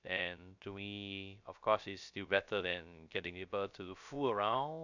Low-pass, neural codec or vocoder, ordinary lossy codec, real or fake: 7.2 kHz; codec, 16 kHz, 0.3 kbps, FocalCodec; none; fake